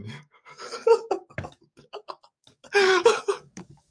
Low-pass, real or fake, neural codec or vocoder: 9.9 kHz; fake; codec, 44.1 kHz, 7.8 kbps, DAC